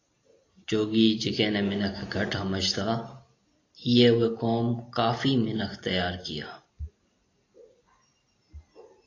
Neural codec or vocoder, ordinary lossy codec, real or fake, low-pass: none; AAC, 32 kbps; real; 7.2 kHz